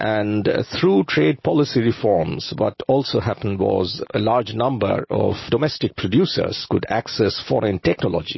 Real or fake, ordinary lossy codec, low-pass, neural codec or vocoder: real; MP3, 24 kbps; 7.2 kHz; none